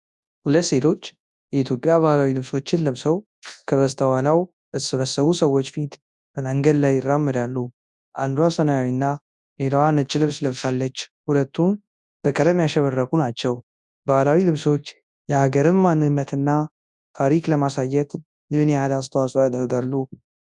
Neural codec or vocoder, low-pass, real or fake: codec, 24 kHz, 0.9 kbps, WavTokenizer, large speech release; 10.8 kHz; fake